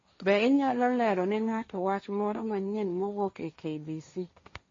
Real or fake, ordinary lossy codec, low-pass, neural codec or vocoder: fake; MP3, 32 kbps; 7.2 kHz; codec, 16 kHz, 1.1 kbps, Voila-Tokenizer